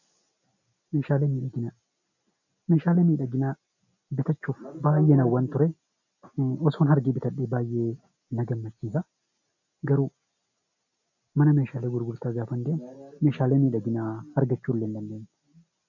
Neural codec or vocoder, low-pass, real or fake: none; 7.2 kHz; real